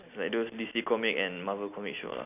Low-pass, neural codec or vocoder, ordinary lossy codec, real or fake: 3.6 kHz; none; none; real